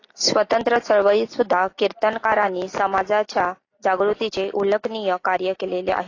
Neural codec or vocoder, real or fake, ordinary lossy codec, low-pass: none; real; AAC, 32 kbps; 7.2 kHz